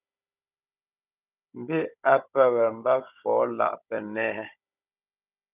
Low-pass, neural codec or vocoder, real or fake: 3.6 kHz; codec, 16 kHz, 16 kbps, FunCodec, trained on Chinese and English, 50 frames a second; fake